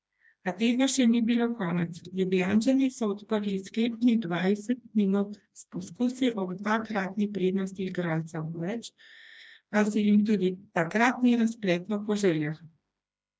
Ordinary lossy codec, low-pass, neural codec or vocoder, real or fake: none; none; codec, 16 kHz, 1 kbps, FreqCodec, smaller model; fake